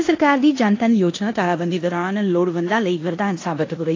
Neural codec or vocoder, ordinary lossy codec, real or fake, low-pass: codec, 16 kHz in and 24 kHz out, 0.9 kbps, LongCat-Audio-Codec, four codebook decoder; AAC, 32 kbps; fake; 7.2 kHz